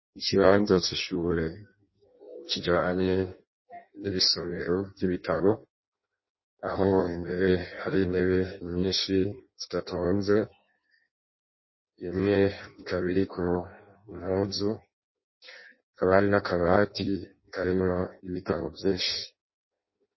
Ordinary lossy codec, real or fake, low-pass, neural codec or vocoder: MP3, 24 kbps; fake; 7.2 kHz; codec, 16 kHz in and 24 kHz out, 0.6 kbps, FireRedTTS-2 codec